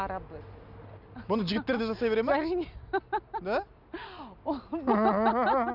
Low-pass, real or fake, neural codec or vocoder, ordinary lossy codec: 5.4 kHz; real; none; none